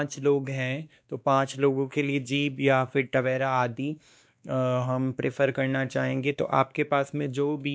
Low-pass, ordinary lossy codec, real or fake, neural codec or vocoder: none; none; fake; codec, 16 kHz, 2 kbps, X-Codec, WavLM features, trained on Multilingual LibriSpeech